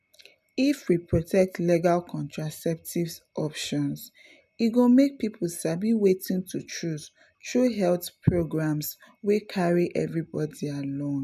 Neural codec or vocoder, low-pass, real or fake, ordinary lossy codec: none; 14.4 kHz; real; none